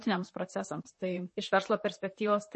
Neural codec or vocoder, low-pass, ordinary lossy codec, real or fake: vocoder, 44.1 kHz, 128 mel bands, Pupu-Vocoder; 10.8 kHz; MP3, 32 kbps; fake